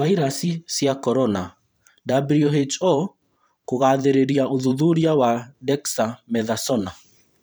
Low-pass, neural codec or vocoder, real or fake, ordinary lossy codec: none; vocoder, 44.1 kHz, 128 mel bands every 512 samples, BigVGAN v2; fake; none